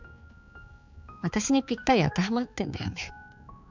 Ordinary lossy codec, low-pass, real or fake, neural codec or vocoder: none; 7.2 kHz; fake; codec, 16 kHz, 4 kbps, X-Codec, HuBERT features, trained on balanced general audio